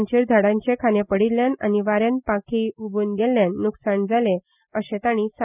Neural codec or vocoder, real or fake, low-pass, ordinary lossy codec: none; real; 3.6 kHz; none